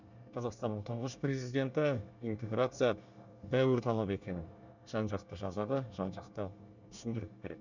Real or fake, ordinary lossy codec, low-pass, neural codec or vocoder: fake; none; 7.2 kHz; codec, 24 kHz, 1 kbps, SNAC